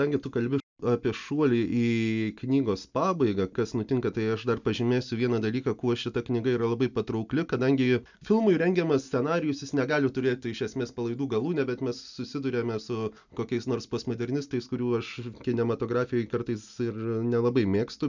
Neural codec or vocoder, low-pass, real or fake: none; 7.2 kHz; real